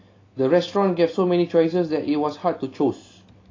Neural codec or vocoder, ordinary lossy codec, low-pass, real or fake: none; AAC, 32 kbps; 7.2 kHz; real